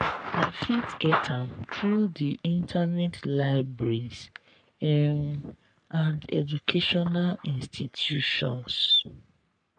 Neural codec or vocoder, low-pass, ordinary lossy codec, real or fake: codec, 44.1 kHz, 3.4 kbps, Pupu-Codec; 9.9 kHz; MP3, 96 kbps; fake